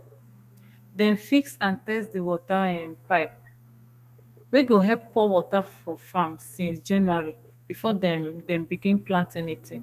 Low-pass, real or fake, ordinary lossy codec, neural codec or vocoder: 14.4 kHz; fake; none; codec, 32 kHz, 1.9 kbps, SNAC